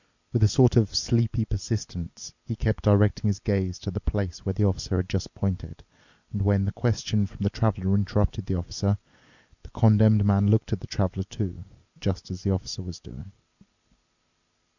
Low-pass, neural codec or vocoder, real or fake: 7.2 kHz; none; real